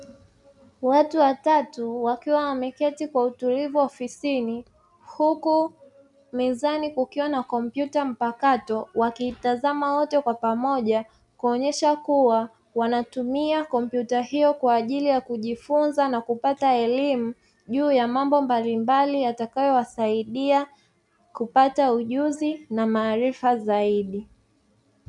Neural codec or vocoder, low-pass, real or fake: none; 10.8 kHz; real